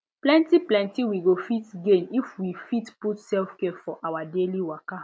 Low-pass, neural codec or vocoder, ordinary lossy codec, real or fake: none; none; none; real